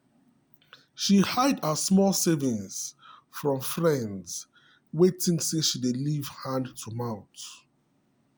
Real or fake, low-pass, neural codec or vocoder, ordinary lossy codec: real; none; none; none